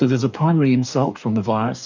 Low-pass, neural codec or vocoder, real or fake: 7.2 kHz; codec, 44.1 kHz, 2.6 kbps, DAC; fake